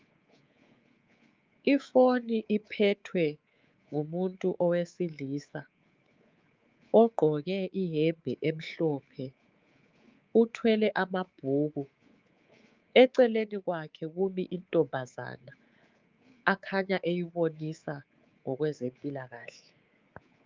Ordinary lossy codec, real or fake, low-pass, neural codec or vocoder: Opus, 24 kbps; fake; 7.2 kHz; codec, 24 kHz, 3.1 kbps, DualCodec